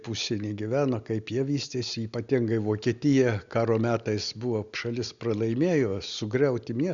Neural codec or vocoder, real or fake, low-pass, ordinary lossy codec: none; real; 7.2 kHz; Opus, 64 kbps